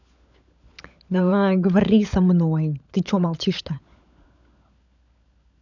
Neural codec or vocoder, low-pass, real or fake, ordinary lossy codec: codec, 16 kHz, 16 kbps, FunCodec, trained on LibriTTS, 50 frames a second; 7.2 kHz; fake; none